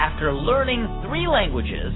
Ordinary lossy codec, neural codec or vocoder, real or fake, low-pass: AAC, 16 kbps; none; real; 7.2 kHz